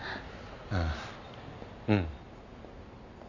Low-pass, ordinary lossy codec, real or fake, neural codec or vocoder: 7.2 kHz; none; real; none